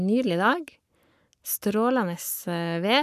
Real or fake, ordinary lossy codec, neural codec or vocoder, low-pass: fake; none; codec, 44.1 kHz, 7.8 kbps, Pupu-Codec; 14.4 kHz